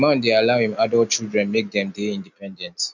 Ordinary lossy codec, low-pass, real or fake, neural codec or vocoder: none; 7.2 kHz; real; none